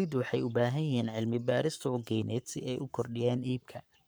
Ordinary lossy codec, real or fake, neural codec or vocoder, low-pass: none; fake; codec, 44.1 kHz, 7.8 kbps, Pupu-Codec; none